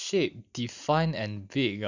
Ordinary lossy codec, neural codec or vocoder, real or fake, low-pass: none; none; real; 7.2 kHz